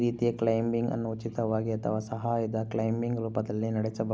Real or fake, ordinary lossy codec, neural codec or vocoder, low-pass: real; none; none; none